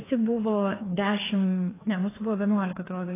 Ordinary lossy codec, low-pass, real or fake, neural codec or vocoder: AAC, 16 kbps; 3.6 kHz; fake; codec, 16 kHz, 2 kbps, FreqCodec, larger model